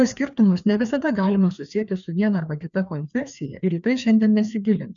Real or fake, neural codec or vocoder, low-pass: fake; codec, 16 kHz, 2 kbps, FreqCodec, larger model; 7.2 kHz